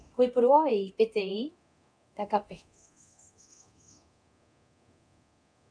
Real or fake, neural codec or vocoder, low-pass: fake; codec, 24 kHz, 0.9 kbps, DualCodec; 9.9 kHz